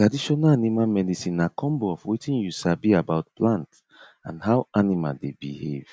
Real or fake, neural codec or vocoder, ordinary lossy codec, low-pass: real; none; none; none